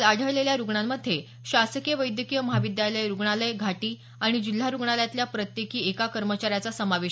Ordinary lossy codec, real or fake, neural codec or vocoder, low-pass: none; real; none; none